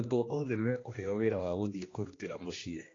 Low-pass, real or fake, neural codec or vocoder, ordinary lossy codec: 7.2 kHz; fake; codec, 16 kHz, 1 kbps, X-Codec, HuBERT features, trained on general audio; AAC, 32 kbps